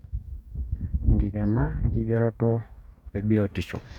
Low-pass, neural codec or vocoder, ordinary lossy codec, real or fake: 19.8 kHz; codec, 44.1 kHz, 2.6 kbps, DAC; none; fake